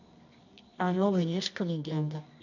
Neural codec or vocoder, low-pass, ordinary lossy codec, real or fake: codec, 24 kHz, 0.9 kbps, WavTokenizer, medium music audio release; 7.2 kHz; none; fake